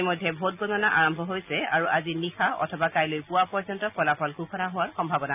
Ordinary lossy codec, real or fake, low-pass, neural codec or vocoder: none; real; 3.6 kHz; none